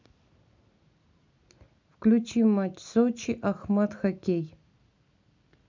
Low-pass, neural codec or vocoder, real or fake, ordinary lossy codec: 7.2 kHz; none; real; MP3, 64 kbps